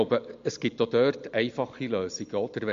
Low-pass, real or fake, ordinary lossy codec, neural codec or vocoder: 7.2 kHz; real; MP3, 48 kbps; none